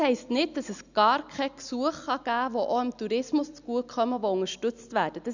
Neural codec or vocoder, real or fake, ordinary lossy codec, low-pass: none; real; none; 7.2 kHz